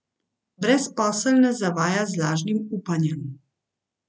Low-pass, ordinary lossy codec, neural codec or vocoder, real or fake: none; none; none; real